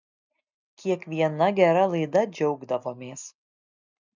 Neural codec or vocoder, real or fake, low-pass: none; real; 7.2 kHz